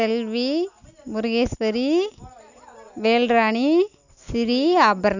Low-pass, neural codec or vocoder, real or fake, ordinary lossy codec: 7.2 kHz; none; real; none